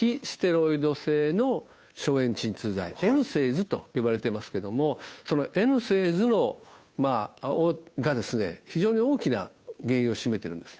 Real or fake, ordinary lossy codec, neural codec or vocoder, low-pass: fake; none; codec, 16 kHz, 2 kbps, FunCodec, trained on Chinese and English, 25 frames a second; none